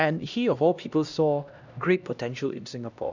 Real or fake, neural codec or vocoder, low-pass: fake; codec, 16 kHz, 1 kbps, X-Codec, HuBERT features, trained on LibriSpeech; 7.2 kHz